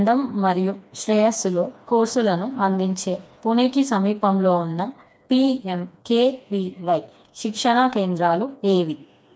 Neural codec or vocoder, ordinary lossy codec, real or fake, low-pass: codec, 16 kHz, 2 kbps, FreqCodec, smaller model; none; fake; none